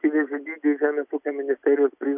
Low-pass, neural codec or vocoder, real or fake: 3.6 kHz; none; real